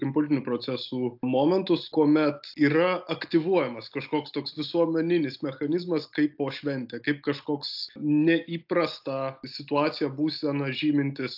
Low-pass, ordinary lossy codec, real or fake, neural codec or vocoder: 5.4 kHz; AAC, 48 kbps; real; none